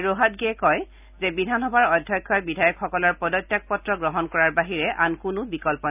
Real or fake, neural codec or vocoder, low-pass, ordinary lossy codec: real; none; 3.6 kHz; none